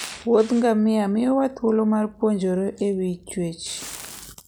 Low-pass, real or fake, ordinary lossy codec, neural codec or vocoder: none; real; none; none